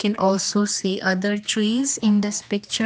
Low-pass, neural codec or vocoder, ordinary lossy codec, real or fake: none; codec, 16 kHz, 2 kbps, X-Codec, HuBERT features, trained on general audio; none; fake